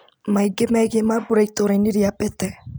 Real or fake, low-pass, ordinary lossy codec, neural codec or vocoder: real; none; none; none